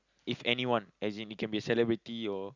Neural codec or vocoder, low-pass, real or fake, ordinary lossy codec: none; 7.2 kHz; real; Opus, 64 kbps